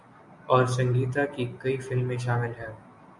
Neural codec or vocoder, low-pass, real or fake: none; 10.8 kHz; real